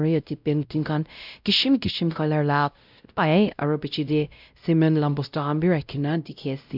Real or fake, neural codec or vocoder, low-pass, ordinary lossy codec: fake; codec, 16 kHz, 0.5 kbps, X-Codec, WavLM features, trained on Multilingual LibriSpeech; 5.4 kHz; none